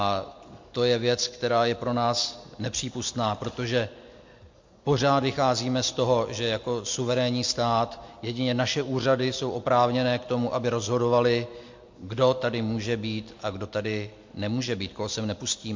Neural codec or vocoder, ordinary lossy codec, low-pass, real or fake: none; MP3, 48 kbps; 7.2 kHz; real